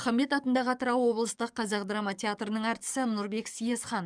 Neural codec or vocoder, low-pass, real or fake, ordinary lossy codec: codec, 44.1 kHz, 7.8 kbps, DAC; 9.9 kHz; fake; none